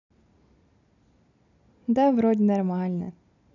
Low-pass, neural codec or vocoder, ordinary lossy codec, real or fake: 7.2 kHz; none; none; real